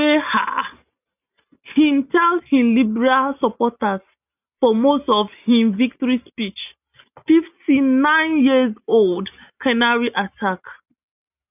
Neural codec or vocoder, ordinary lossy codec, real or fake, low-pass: none; none; real; 3.6 kHz